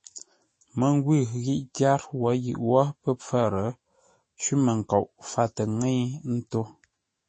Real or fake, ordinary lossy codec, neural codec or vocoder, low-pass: fake; MP3, 32 kbps; autoencoder, 48 kHz, 128 numbers a frame, DAC-VAE, trained on Japanese speech; 9.9 kHz